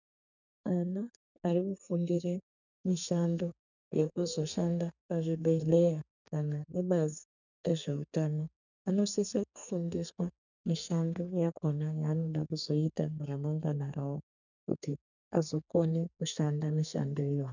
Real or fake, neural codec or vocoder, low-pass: fake; codec, 32 kHz, 1.9 kbps, SNAC; 7.2 kHz